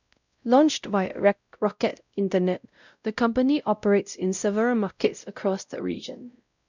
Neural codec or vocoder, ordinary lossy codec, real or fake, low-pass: codec, 16 kHz, 0.5 kbps, X-Codec, WavLM features, trained on Multilingual LibriSpeech; none; fake; 7.2 kHz